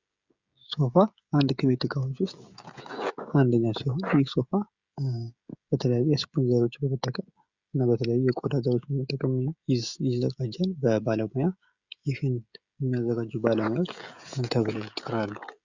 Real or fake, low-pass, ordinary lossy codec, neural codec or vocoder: fake; 7.2 kHz; Opus, 64 kbps; codec, 16 kHz, 16 kbps, FreqCodec, smaller model